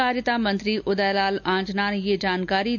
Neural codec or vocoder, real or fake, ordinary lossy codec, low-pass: none; real; none; 7.2 kHz